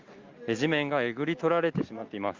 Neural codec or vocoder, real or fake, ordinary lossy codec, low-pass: none; real; Opus, 32 kbps; 7.2 kHz